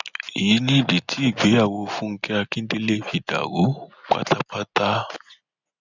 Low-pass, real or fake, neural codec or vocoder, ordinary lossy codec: 7.2 kHz; real; none; none